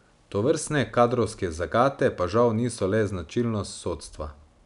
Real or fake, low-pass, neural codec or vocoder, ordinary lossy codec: real; 10.8 kHz; none; none